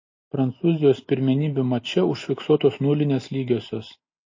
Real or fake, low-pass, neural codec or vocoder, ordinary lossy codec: real; 7.2 kHz; none; MP3, 32 kbps